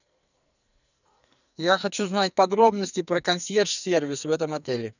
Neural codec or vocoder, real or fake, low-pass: codec, 44.1 kHz, 2.6 kbps, SNAC; fake; 7.2 kHz